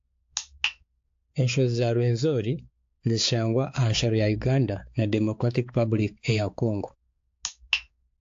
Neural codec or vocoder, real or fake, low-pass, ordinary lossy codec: codec, 16 kHz, 4 kbps, X-Codec, HuBERT features, trained on balanced general audio; fake; 7.2 kHz; AAC, 48 kbps